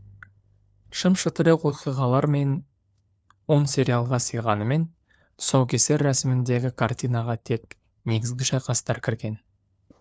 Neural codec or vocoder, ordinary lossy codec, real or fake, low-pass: codec, 16 kHz, 4 kbps, FunCodec, trained on LibriTTS, 50 frames a second; none; fake; none